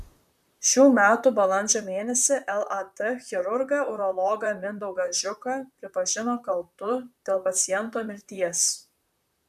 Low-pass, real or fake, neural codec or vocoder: 14.4 kHz; fake; vocoder, 44.1 kHz, 128 mel bands, Pupu-Vocoder